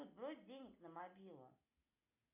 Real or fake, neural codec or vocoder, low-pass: real; none; 3.6 kHz